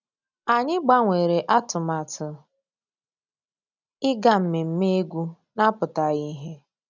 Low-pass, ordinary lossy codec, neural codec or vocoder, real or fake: 7.2 kHz; none; none; real